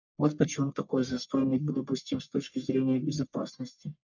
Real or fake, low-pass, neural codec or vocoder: fake; 7.2 kHz; codec, 44.1 kHz, 1.7 kbps, Pupu-Codec